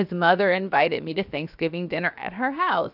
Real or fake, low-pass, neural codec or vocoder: fake; 5.4 kHz; codec, 16 kHz, 0.7 kbps, FocalCodec